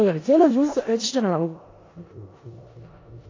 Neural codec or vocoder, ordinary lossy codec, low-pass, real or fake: codec, 16 kHz in and 24 kHz out, 0.4 kbps, LongCat-Audio-Codec, four codebook decoder; AAC, 32 kbps; 7.2 kHz; fake